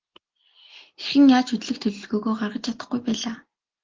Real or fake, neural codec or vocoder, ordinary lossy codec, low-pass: real; none; Opus, 16 kbps; 7.2 kHz